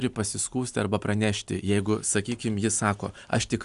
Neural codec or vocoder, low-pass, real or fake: none; 10.8 kHz; real